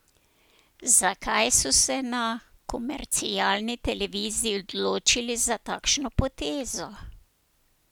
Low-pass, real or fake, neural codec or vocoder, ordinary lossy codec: none; real; none; none